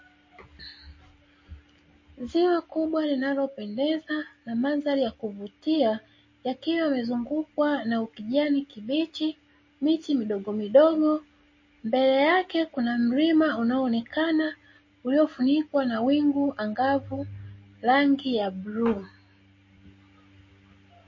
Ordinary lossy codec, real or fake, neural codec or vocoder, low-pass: MP3, 32 kbps; real; none; 7.2 kHz